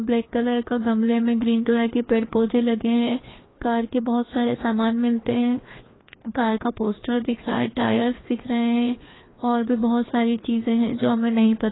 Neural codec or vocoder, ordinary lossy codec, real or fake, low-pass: codec, 16 kHz, 2 kbps, FreqCodec, larger model; AAC, 16 kbps; fake; 7.2 kHz